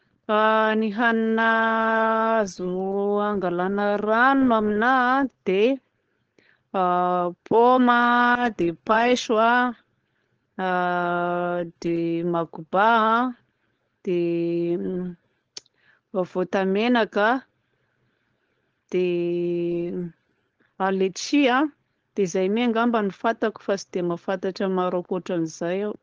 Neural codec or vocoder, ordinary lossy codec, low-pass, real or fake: codec, 16 kHz, 4.8 kbps, FACodec; Opus, 16 kbps; 7.2 kHz; fake